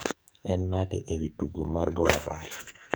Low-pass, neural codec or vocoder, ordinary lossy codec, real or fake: none; codec, 44.1 kHz, 2.6 kbps, SNAC; none; fake